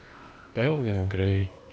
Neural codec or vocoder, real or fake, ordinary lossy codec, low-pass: codec, 16 kHz, 0.8 kbps, ZipCodec; fake; none; none